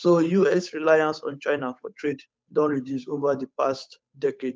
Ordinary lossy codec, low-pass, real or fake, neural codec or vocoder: none; none; fake; codec, 16 kHz, 8 kbps, FunCodec, trained on Chinese and English, 25 frames a second